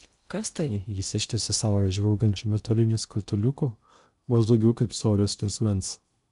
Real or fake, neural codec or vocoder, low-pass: fake; codec, 16 kHz in and 24 kHz out, 0.6 kbps, FocalCodec, streaming, 2048 codes; 10.8 kHz